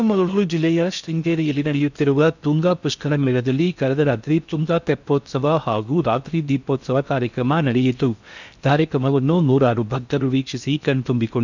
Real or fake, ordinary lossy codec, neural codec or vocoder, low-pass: fake; none; codec, 16 kHz in and 24 kHz out, 0.6 kbps, FocalCodec, streaming, 2048 codes; 7.2 kHz